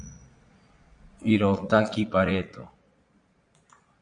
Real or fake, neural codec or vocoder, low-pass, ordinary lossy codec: fake; vocoder, 22.05 kHz, 80 mel bands, Vocos; 9.9 kHz; MP3, 64 kbps